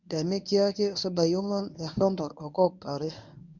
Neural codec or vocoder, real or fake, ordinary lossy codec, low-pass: codec, 24 kHz, 0.9 kbps, WavTokenizer, medium speech release version 1; fake; none; 7.2 kHz